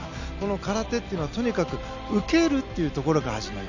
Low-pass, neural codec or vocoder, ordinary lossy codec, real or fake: 7.2 kHz; none; none; real